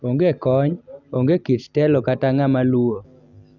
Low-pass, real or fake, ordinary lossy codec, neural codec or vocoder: 7.2 kHz; real; none; none